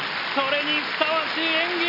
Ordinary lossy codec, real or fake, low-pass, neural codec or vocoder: none; real; 5.4 kHz; none